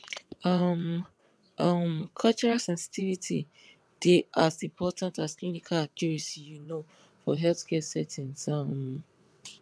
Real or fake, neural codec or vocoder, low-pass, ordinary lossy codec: fake; vocoder, 22.05 kHz, 80 mel bands, WaveNeXt; none; none